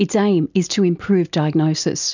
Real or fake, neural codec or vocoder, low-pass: real; none; 7.2 kHz